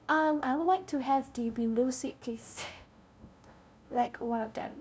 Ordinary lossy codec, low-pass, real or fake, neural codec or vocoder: none; none; fake; codec, 16 kHz, 0.5 kbps, FunCodec, trained on LibriTTS, 25 frames a second